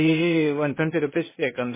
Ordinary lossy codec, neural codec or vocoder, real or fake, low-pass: MP3, 16 kbps; codec, 16 kHz, 0.8 kbps, ZipCodec; fake; 3.6 kHz